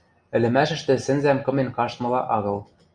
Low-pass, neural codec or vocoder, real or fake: 9.9 kHz; none; real